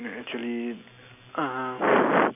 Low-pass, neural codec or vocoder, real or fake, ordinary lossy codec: 3.6 kHz; none; real; none